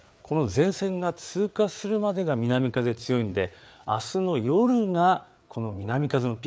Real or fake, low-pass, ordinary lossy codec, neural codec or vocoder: fake; none; none; codec, 16 kHz, 4 kbps, FreqCodec, larger model